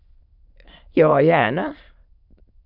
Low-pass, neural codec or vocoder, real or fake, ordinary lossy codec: 5.4 kHz; autoencoder, 22.05 kHz, a latent of 192 numbers a frame, VITS, trained on many speakers; fake; MP3, 48 kbps